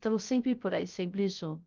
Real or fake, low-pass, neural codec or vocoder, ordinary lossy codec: fake; 7.2 kHz; codec, 16 kHz, 0.3 kbps, FocalCodec; Opus, 24 kbps